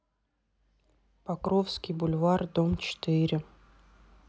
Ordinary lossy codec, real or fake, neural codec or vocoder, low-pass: none; real; none; none